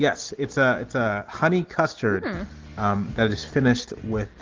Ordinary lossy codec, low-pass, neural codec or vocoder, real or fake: Opus, 16 kbps; 7.2 kHz; none; real